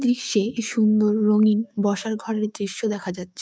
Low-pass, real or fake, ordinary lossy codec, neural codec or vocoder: none; fake; none; codec, 16 kHz, 6 kbps, DAC